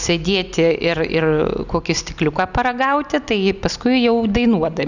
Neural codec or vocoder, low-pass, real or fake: none; 7.2 kHz; real